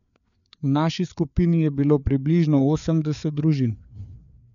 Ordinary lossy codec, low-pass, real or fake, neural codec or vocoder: none; 7.2 kHz; fake; codec, 16 kHz, 4 kbps, FreqCodec, larger model